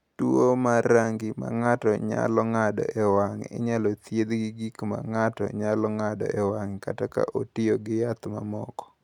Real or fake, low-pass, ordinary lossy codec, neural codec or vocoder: real; 19.8 kHz; none; none